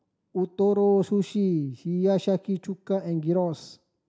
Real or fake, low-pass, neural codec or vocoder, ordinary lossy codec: real; none; none; none